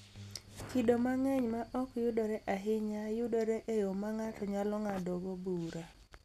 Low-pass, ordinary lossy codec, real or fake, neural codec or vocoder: 14.4 kHz; none; real; none